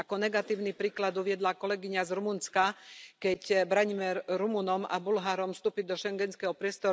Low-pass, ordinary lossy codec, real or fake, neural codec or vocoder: none; none; real; none